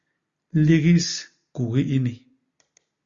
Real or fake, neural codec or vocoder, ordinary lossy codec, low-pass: real; none; Opus, 64 kbps; 7.2 kHz